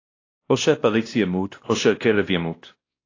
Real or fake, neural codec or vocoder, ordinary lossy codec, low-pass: fake; codec, 16 kHz, 1 kbps, X-Codec, WavLM features, trained on Multilingual LibriSpeech; AAC, 32 kbps; 7.2 kHz